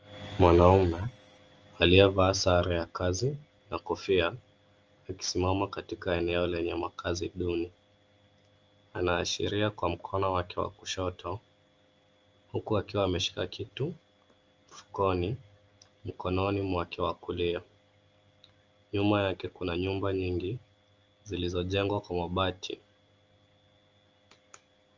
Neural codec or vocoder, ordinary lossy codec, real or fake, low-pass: none; Opus, 24 kbps; real; 7.2 kHz